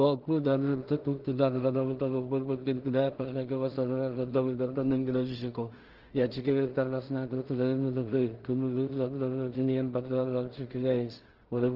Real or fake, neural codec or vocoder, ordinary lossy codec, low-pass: fake; codec, 16 kHz in and 24 kHz out, 0.4 kbps, LongCat-Audio-Codec, two codebook decoder; Opus, 16 kbps; 5.4 kHz